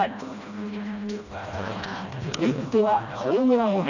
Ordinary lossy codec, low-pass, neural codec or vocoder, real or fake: none; 7.2 kHz; codec, 16 kHz, 1 kbps, FreqCodec, smaller model; fake